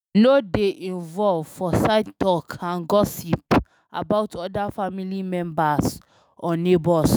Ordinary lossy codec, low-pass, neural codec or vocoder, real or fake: none; none; autoencoder, 48 kHz, 128 numbers a frame, DAC-VAE, trained on Japanese speech; fake